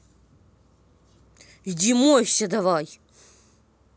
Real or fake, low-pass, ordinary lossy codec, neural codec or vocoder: real; none; none; none